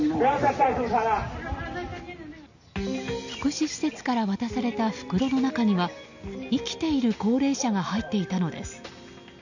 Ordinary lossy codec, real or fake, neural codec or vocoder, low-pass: none; real; none; 7.2 kHz